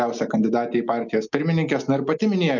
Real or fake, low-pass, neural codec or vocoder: real; 7.2 kHz; none